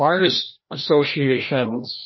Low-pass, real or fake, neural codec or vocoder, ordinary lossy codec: 7.2 kHz; fake; codec, 16 kHz, 1 kbps, FreqCodec, larger model; MP3, 24 kbps